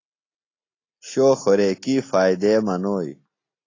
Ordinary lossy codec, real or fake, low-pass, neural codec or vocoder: AAC, 32 kbps; real; 7.2 kHz; none